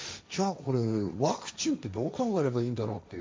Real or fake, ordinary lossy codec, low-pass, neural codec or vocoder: fake; none; none; codec, 16 kHz, 1.1 kbps, Voila-Tokenizer